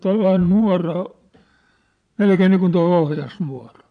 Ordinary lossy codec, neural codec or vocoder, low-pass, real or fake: none; vocoder, 22.05 kHz, 80 mel bands, Vocos; 9.9 kHz; fake